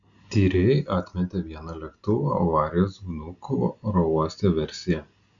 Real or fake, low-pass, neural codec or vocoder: real; 7.2 kHz; none